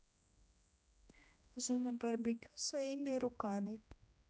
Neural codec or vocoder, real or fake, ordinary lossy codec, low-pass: codec, 16 kHz, 1 kbps, X-Codec, HuBERT features, trained on general audio; fake; none; none